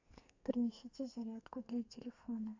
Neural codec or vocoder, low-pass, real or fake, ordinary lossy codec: codec, 32 kHz, 1.9 kbps, SNAC; 7.2 kHz; fake; AAC, 48 kbps